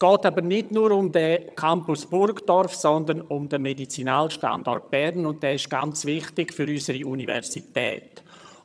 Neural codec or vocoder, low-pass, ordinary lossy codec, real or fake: vocoder, 22.05 kHz, 80 mel bands, HiFi-GAN; none; none; fake